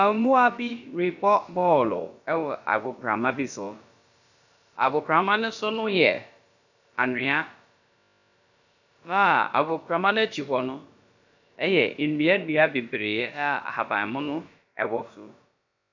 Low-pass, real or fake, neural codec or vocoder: 7.2 kHz; fake; codec, 16 kHz, about 1 kbps, DyCAST, with the encoder's durations